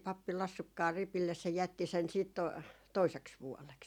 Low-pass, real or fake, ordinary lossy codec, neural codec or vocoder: 19.8 kHz; real; none; none